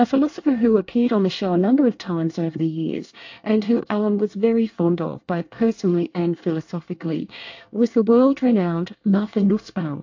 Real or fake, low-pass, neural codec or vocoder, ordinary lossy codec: fake; 7.2 kHz; codec, 24 kHz, 1 kbps, SNAC; AAC, 48 kbps